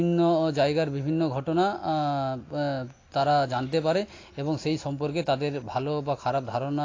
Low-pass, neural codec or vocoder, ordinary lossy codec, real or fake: 7.2 kHz; none; AAC, 32 kbps; real